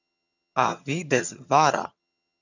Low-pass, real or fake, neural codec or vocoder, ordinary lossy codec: 7.2 kHz; fake; vocoder, 22.05 kHz, 80 mel bands, HiFi-GAN; none